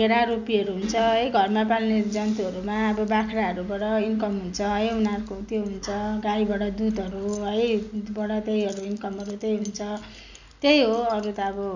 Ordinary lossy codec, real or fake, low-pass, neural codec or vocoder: none; real; 7.2 kHz; none